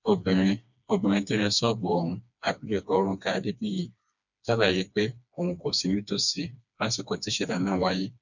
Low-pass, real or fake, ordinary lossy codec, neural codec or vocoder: 7.2 kHz; fake; none; codec, 16 kHz, 2 kbps, FreqCodec, smaller model